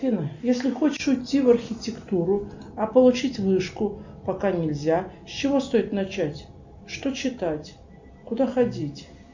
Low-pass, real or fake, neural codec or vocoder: 7.2 kHz; real; none